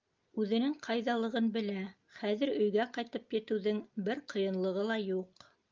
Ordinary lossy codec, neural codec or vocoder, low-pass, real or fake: Opus, 24 kbps; none; 7.2 kHz; real